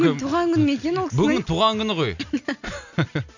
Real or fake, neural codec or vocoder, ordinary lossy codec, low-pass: real; none; none; 7.2 kHz